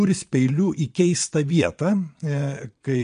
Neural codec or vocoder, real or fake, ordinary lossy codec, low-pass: vocoder, 22.05 kHz, 80 mel bands, Vocos; fake; MP3, 64 kbps; 9.9 kHz